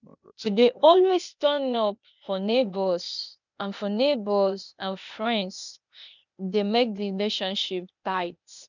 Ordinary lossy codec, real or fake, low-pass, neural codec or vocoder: none; fake; 7.2 kHz; codec, 16 kHz, 0.8 kbps, ZipCodec